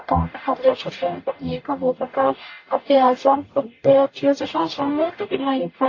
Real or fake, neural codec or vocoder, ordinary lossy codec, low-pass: fake; codec, 44.1 kHz, 0.9 kbps, DAC; AAC, 32 kbps; 7.2 kHz